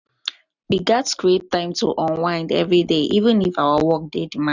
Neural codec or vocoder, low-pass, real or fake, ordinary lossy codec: none; 7.2 kHz; real; none